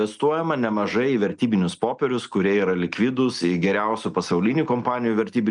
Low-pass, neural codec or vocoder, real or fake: 9.9 kHz; none; real